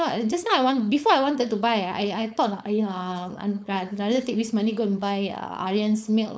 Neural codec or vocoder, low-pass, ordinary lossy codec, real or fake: codec, 16 kHz, 4.8 kbps, FACodec; none; none; fake